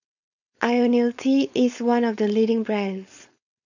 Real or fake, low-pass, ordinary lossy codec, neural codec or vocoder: fake; 7.2 kHz; none; codec, 16 kHz, 4.8 kbps, FACodec